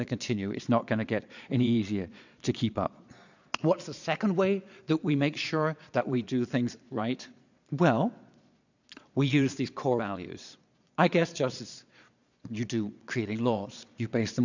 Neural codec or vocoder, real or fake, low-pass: vocoder, 22.05 kHz, 80 mel bands, Vocos; fake; 7.2 kHz